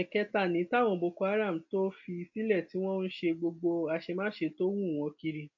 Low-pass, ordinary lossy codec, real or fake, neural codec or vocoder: 7.2 kHz; AAC, 48 kbps; real; none